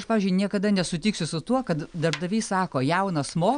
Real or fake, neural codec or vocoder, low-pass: real; none; 9.9 kHz